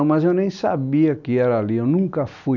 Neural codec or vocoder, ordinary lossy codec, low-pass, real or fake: none; none; 7.2 kHz; real